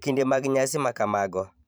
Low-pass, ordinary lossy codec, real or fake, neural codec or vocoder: none; none; fake; vocoder, 44.1 kHz, 128 mel bands every 512 samples, BigVGAN v2